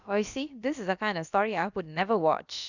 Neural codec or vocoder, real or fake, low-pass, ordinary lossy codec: codec, 16 kHz, about 1 kbps, DyCAST, with the encoder's durations; fake; 7.2 kHz; none